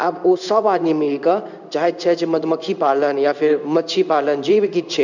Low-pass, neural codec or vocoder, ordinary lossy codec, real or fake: 7.2 kHz; codec, 16 kHz in and 24 kHz out, 1 kbps, XY-Tokenizer; none; fake